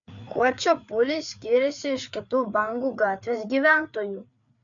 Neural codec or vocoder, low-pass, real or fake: codec, 16 kHz, 4 kbps, FreqCodec, larger model; 7.2 kHz; fake